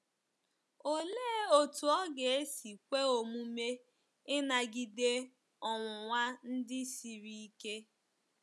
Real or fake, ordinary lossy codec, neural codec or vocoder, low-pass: real; none; none; none